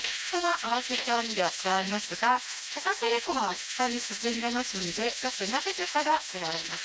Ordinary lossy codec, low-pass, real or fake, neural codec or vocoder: none; none; fake; codec, 16 kHz, 1 kbps, FreqCodec, smaller model